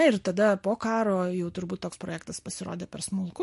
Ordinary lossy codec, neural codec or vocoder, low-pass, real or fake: MP3, 48 kbps; none; 14.4 kHz; real